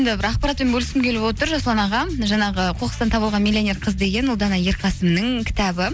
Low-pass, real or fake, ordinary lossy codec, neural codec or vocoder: none; real; none; none